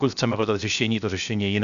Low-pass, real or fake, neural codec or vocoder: 7.2 kHz; fake; codec, 16 kHz, 0.7 kbps, FocalCodec